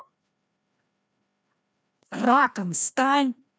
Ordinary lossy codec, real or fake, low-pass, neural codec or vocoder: none; fake; none; codec, 16 kHz, 1 kbps, FreqCodec, larger model